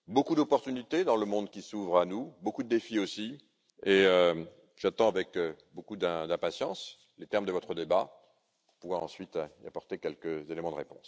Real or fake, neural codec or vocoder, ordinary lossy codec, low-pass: real; none; none; none